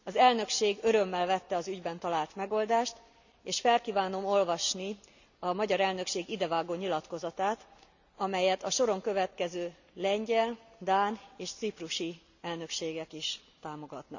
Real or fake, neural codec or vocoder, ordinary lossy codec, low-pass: real; none; none; 7.2 kHz